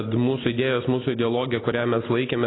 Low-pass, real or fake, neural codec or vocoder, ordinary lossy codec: 7.2 kHz; real; none; AAC, 16 kbps